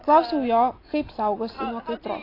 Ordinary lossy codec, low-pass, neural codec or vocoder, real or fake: AAC, 24 kbps; 5.4 kHz; none; real